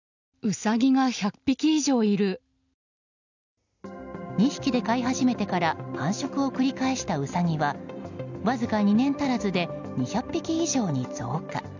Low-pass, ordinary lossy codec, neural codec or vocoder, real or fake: 7.2 kHz; none; none; real